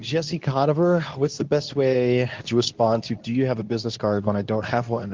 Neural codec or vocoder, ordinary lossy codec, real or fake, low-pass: codec, 24 kHz, 0.9 kbps, WavTokenizer, medium speech release version 2; Opus, 24 kbps; fake; 7.2 kHz